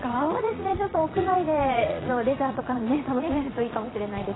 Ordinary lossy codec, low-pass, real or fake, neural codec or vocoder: AAC, 16 kbps; 7.2 kHz; fake; vocoder, 22.05 kHz, 80 mel bands, Vocos